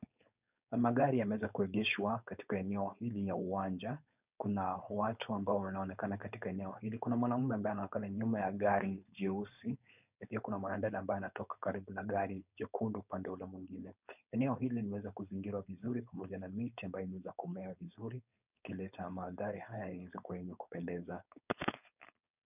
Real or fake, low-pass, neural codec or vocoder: fake; 3.6 kHz; codec, 16 kHz, 4.8 kbps, FACodec